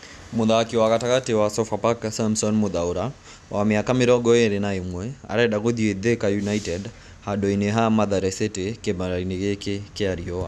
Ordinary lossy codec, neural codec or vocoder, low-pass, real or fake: none; none; none; real